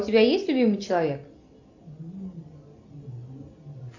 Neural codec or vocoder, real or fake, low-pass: none; real; 7.2 kHz